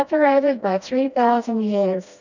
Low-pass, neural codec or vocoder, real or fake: 7.2 kHz; codec, 16 kHz, 1 kbps, FreqCodec, smaller model; fake